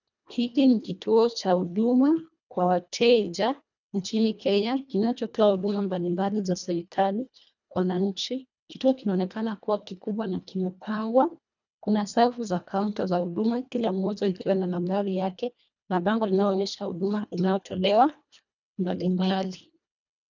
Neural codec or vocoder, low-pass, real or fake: codec, 24 kHz, 1.5 kbps, HILCodec; 7.2 kHz; fake